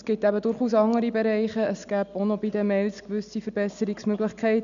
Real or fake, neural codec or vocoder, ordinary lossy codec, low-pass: real; none; none; 7.2 kHz